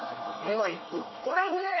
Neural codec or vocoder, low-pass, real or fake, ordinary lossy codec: codec, 24 kHz, 1 kbps, SNAC; 7.2 kHz; fake; MP3, 24 kbps